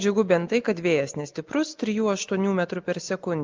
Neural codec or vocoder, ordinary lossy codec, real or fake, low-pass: none; Opus, 32 kbps; real; 7.2 kHz